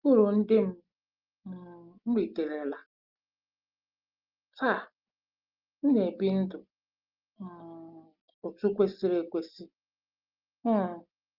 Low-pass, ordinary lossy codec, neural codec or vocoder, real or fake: 5.4 kHz; Opus, 32 kbps; none; real